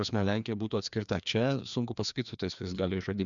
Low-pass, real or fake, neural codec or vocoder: 7.2 kHz; fake; codec, 16 kHz, 2 kbps, FreqCodec, larger model